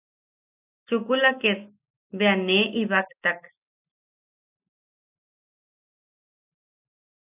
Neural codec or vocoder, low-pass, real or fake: none; 3.6 kHz; real